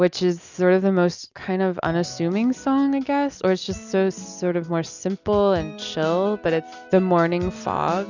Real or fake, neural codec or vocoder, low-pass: real; none; 7.2 kHz